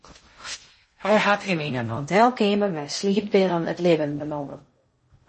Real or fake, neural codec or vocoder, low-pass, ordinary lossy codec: fake; codec, 16 kHz in and 24 kHz out, 0.6 kbps, FocalCodec, streaming, 2048 codes; 10.8 kHz; MP3, 32 kbps